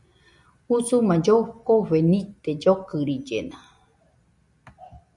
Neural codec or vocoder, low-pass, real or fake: none; 10.8 kHz; real